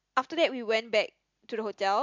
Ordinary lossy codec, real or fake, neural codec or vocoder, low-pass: MP3, 64 kbps; real; none; 7.2 kHz